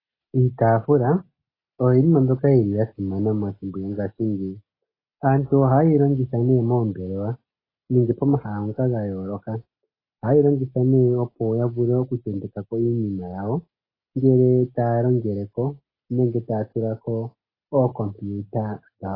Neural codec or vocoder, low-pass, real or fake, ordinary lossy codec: none; 5.4 kHz; real; AAC, 24 kbps